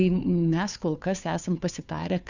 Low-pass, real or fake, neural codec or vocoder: 7.2 kHz; fake; codec, 16 kHz, 2 kbps, FunCodec, trained on Chinese and English, 25 frames a second